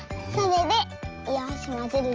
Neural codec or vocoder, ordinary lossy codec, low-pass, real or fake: none; Opus, 24 kbps; 7.2 kHz; real